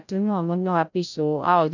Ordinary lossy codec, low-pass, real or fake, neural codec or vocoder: none; 7.2 kHz; fake; codec, 16 kHz, 0.5 kbps, FreqCodec, larger model